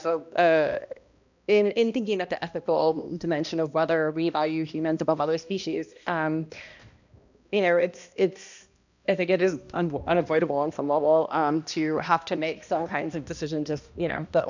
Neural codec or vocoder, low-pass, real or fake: codec, 16 kHz, 1 kbps, X-Codec, HuBERT features, trained on balanced general audio; 7.2 kHz; fake